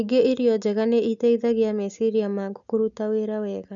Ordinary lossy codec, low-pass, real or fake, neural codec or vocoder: none; 7.2 kHz; real; none